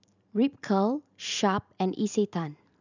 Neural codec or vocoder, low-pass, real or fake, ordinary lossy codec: vocoder, 44.1 kHz, 128 mel bands every 512 samples, BigVGAN v2; 7.2 kHz; fake; none